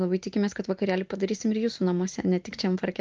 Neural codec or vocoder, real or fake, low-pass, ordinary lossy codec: none; real; 7.2 kHz; Opus, 32 kbps